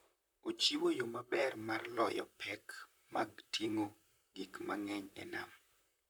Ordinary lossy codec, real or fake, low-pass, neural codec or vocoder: none; fake; none; vocoder, 44.1 kHz, 128 mel bands, Pupu-Vocoder